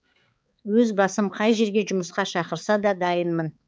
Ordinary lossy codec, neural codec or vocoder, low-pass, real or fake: none; codec, 16 kHz, 4 kbps, X-Codec, HuBERT features, trained on balanced general audio; none; fake